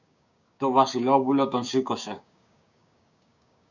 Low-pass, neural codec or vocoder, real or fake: 7.2 kHz; codec, 16 kHz, 6 kbps, DAC; fake